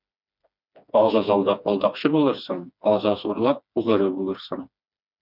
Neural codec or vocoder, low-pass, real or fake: codec, 16 kHz, 2 kbps, FreqCodec, smaller model; 5.4 kHz; fake